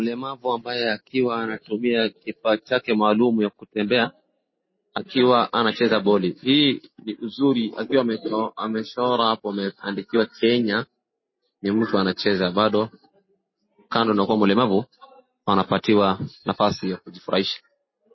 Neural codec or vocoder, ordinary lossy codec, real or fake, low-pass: none; MP3, 24 kbps; real; 7.2 kHz